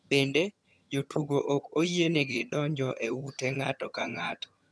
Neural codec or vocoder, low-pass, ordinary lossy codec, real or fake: vocoder, 22.05 kHz, 80 mel bands, HiFi-GAN; none; none; fake